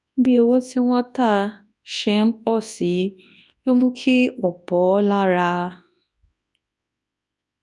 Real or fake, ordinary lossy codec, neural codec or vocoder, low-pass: fake; none; codec, 24 kHz, 0.9 kbps, WavTokenizer, large speech release; 10.8 kHz